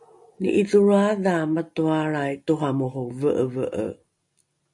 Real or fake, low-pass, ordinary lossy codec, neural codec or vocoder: real; 10.8 kHz; MP3, 64 kbps; none